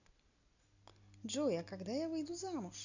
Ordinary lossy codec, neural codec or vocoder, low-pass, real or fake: none; none; 7.2 kHz; real